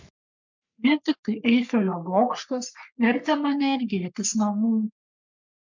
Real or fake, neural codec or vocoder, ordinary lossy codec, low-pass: fake; codec, 44.1 kHz, 3.4 kbps, Pupu-Codec; MP3, 64 kbps; 7.2 kHz